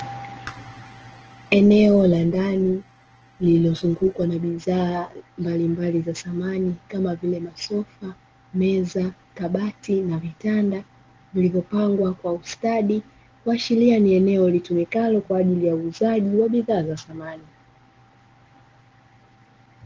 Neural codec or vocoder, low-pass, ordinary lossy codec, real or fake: none; 7.2 kHz; Opus, 16 kbps; real